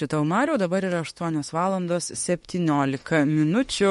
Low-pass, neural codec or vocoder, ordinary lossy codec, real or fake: 19.8 kHz; autoencoder, 48 kHz, 32 numbers a frame, DAC-VAE, trained on Japanese speech; MP3, 48 kbps; fake